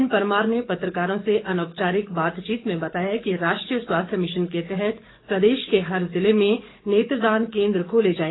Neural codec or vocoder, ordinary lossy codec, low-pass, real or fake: codec, 44.1 kHz, 7.8 kbps, DAC; AAC, 16 kbps; 7.2 kHz; fake